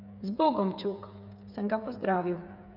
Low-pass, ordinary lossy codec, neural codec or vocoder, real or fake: 5.4 kHz; none; codec, 16 kHz, 8 kbps, FreqCodec, smaller model; fake